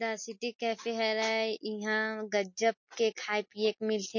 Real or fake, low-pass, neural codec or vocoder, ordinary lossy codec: real; 7.2 kHz; none; MP3, 32 kbps